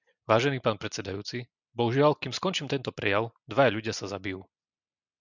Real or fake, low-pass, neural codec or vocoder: real; 7.2 kHz; none